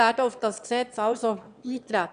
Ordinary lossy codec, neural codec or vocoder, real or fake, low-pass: none; autoencoder, 22.05 kHz, a latent of 192 numbers a frame, VITS, trained on one speaker; fake; 9.9 kHz